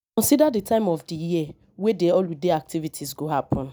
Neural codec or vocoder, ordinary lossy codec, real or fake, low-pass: none; none; real; none